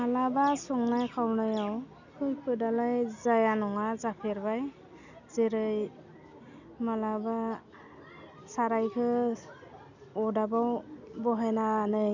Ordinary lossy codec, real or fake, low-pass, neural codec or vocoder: none; real; 7.2 kHz; none